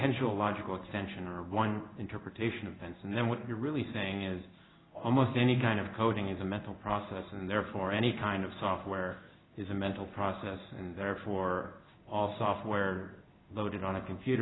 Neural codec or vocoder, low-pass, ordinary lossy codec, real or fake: codec, 16 kHz in and 24 kHz out, 1 kbps, XY-Tokenizer; 7.2 kHz; AAC, 16 kbps; fake